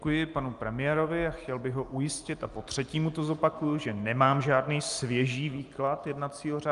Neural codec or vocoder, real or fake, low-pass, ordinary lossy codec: none; real; 10.8 kHz; Opus, 32 kbps